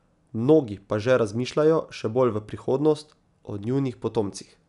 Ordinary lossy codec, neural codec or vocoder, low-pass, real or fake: none; none; 10.8 kHz; real